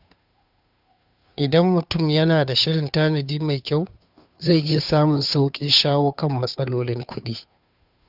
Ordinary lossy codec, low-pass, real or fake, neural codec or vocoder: none; 5.4 kHz; fake; codec, 16 kHz, 2 kbps, FunCodec, trained on Chinese and English, 25 frames a second